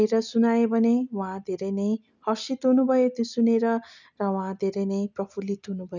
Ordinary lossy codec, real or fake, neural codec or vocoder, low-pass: none; real; none; 7.2 kHz